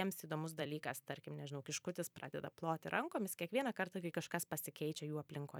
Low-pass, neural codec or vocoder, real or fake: 19.8 kHz; vocoder, 44.1 kHz, 128 mel bands every 256 samples, BigVGAN v2; fake